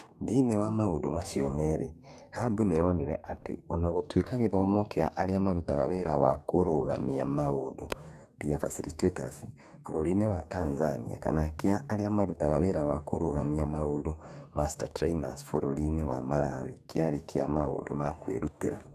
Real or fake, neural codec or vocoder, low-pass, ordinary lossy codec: fake; codec, 44.1 kHz, 2.6 kbps, DAC; 14.4 kHz; none